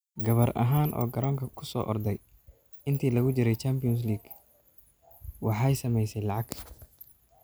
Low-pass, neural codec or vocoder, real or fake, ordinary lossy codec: none; none; real; none